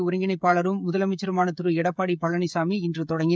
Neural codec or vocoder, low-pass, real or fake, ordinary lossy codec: codec, 16 kHz, 16 kbps, FreqCodec, smaller model; none; fake; none